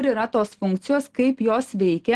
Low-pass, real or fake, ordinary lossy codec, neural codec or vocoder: 10.8 kHz; real; Opus, 16 kbps; none